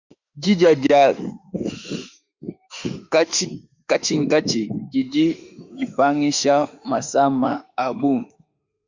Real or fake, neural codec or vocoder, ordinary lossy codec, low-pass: fake; autoencoder, 48 kHz, 32 numbers a frame, DAC-VAE, trained on Japanese speech; Opus, 64 kbps; 7.2 kHz